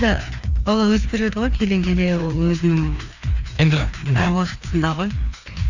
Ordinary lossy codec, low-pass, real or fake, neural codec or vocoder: none; 7.2 kHz; fake; codec, 16 kHz, 2 kbps, FreqCodec, larger model